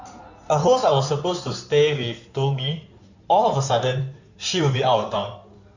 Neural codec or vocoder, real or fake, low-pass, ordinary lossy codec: codec, 16 kHz in and 24 kHz out, 2.2 kbps, FireRedTTS-2 codec; fake; 7.2 kHz; none